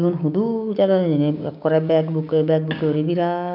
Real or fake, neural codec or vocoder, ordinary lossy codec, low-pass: fake; codec, 16 kHz, 6 kbps, DAC; none; 5.4 kHz